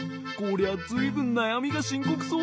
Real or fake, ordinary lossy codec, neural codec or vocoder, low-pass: real; none; none; none